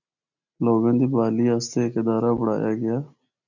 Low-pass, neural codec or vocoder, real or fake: 7.2 kHz; none; real